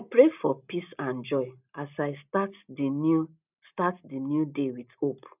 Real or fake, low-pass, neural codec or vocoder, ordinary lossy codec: real; 3.6 kHz; none; none